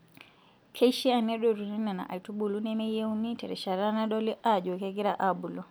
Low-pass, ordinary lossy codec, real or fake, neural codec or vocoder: none; none; real; none